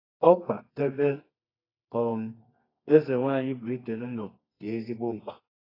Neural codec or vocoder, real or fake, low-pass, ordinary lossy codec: codec, 24 kHz, 0.9 kbps, WavTokenizer, medium music audio release; fake; 5.4 kHz; AAC, 24 kbps